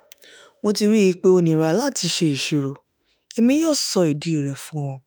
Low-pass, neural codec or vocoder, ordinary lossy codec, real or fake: none; autoencoder, 48 kHz, 32 numbers a frame, DAC-VAE, trained on Japanese speech; none; fake